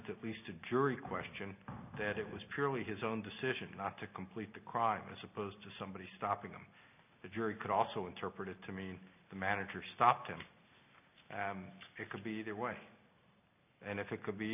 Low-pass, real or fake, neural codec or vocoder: 3.6 kHz; real; none